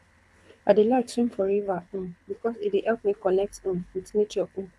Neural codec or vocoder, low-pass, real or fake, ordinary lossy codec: codec, 24 kHz, 6 kbps, HILCodec; none; fake; none